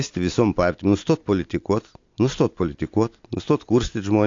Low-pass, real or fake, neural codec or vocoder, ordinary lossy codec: 7.2 kHz; real; none; AAC, 48 kbps